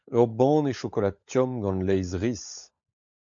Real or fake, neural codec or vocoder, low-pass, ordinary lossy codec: fake; codec, 16 kHz, 16 kbps, FunCodec, trained on LibriTTS, 50 frames a second; 7.2 kHz; MP3, 64 kbps